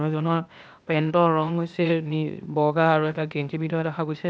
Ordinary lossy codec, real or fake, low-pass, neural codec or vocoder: none; fake; none; codec, 16 kHz, 0.8 kbps, ZipCodec